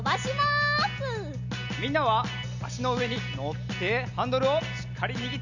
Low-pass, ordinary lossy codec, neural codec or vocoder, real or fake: 7.2 kHz; none; none; real